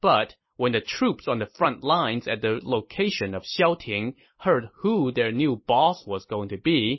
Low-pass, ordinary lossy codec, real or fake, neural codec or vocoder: 7.2 kHz; MP3, 24 kbps; fake; vocoder, 44.1 kHz, 128 mel bands every 256 samples, BigVGAN v2